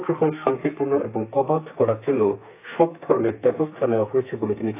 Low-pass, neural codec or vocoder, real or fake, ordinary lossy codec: 3.6 kHz; codec, 32 kHz, 1.9 kbps, SNAC; fake; AAC, 24 kbps